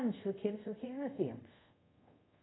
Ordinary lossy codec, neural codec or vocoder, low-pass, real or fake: AAC, 16 kbps; codec, 24 kHz, 0.5 kbps, DualCodec; 7.2 kHz; fake